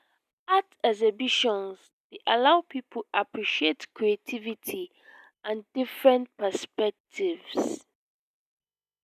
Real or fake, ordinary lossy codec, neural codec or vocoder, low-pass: real; none; none; 14.4 kHz